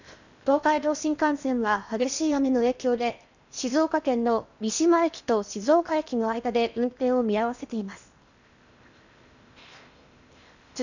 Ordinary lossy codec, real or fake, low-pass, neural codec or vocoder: none; fake; 7.2 kHz; codec, 16 kHz in and 24 kHz out, 0.8 kbps, FocalCodec, streaming, 65536 codes